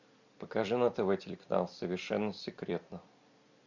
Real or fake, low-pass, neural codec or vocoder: real; 7.2 kHz; none